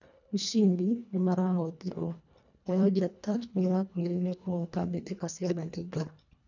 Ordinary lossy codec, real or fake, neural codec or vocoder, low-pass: none; fake; codec, 24 kHz, 1.5 kbps, HILCodec; 7.2 kHz